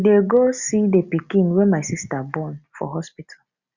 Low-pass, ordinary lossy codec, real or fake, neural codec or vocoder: 7.2 kHz; Opus, 64 kbps; real; none